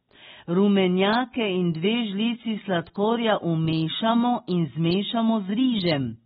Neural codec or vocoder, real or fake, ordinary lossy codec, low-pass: none; real; AAC, 16 kbps; 14.4 kHz